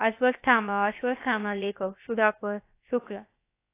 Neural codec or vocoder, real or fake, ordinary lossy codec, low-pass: codec, 16 kHz, about 1 kbps, DyCAST, with the encoder's durations; fake; AAC, 24 kbps; 3.6 kHz